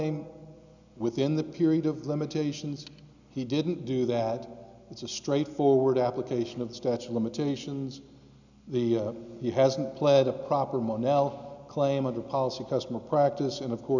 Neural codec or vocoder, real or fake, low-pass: none; real; 7.2 kHz